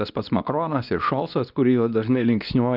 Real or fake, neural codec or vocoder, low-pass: fake; codec, 24 kHz, 0.9 kbps, WavTokenizer, medium speech release version 2; 5.4 kHz